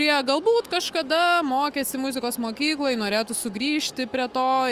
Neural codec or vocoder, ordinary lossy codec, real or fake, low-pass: autoencoder, 48 kHz, 128 numbers a frame, DAC-VAE, trained on Japanese speech; Opus, 32 kbps; fake; 14.4 kHz